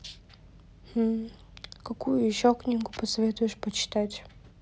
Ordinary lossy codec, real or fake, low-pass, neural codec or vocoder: none; real; none; none